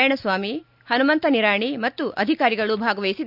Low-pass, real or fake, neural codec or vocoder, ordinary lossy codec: 5.4 kHz; real; none; none